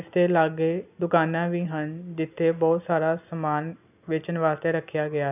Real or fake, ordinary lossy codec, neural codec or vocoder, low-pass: real; none; none; 3.6 kHz